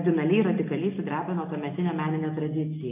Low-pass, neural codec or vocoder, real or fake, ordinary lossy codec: 3.6 kHz; none; real; AAC, 32 kbps